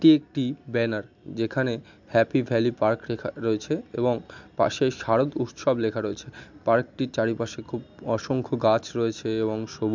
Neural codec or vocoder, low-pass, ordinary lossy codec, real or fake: none; 7.2 kHz; MP3, 64 kbps; real